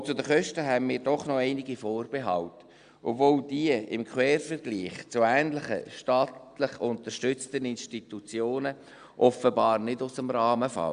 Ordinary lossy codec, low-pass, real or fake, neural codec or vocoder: Opus, 64 kbps; 9.9 kHz; real; none